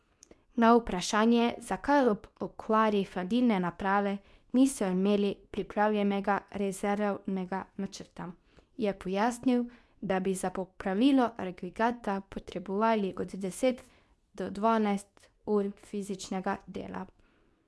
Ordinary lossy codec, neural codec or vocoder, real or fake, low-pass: none; codec, 24 kHz, 0.9 kbps, WavTokenizer, medium speech release version 2; fake; none